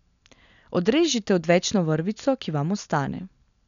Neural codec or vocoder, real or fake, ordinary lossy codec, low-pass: none; real; none; 7.2 kHz